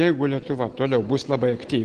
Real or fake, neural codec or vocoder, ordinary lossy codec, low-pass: real; none; Opus, 16 kbps; 9.9 kHz